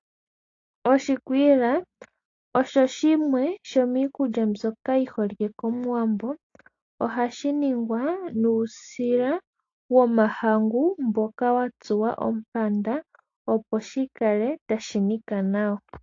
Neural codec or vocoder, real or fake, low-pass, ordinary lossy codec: none; real; 7.2 kHz; AAC, 48 kbps